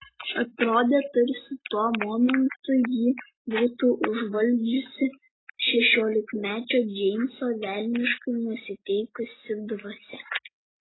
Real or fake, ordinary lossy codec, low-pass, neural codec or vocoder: real; AAC, 16 kbps; 7.2 kHz; none